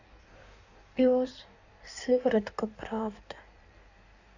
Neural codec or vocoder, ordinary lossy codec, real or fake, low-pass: codec, 16 kHz in and 24 kHz out, 1.1 kbps, FireRedTTS-2 codec; none; fake; 7.2 kHz